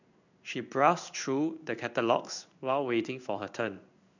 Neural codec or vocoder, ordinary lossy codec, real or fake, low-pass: codec, 16 kHz in and 24 kHz out, 1 kbps, XY-Tokenizer; none; fake; 7.2 kHz